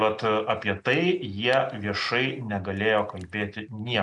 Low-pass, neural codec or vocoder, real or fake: 10.8 kHz; none; real